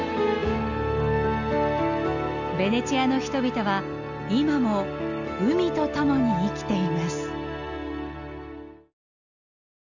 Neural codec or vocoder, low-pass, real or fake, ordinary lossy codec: none; 7.2 kHz; real; none